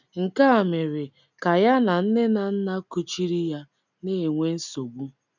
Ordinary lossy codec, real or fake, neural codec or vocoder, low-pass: none; real; none; 7.2 kHz